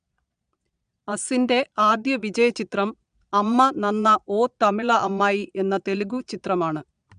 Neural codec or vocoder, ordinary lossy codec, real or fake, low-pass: vocoder, 22.05 kHz, 80 mel bands, Vocos; none; fake; 9.9 kHz